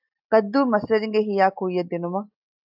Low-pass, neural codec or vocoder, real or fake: 5.4 kHz; none; real